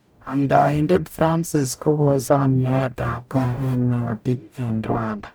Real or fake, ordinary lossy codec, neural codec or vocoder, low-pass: fake; none; codec, 44.1 kHz, 0.9 kbps, DAC; none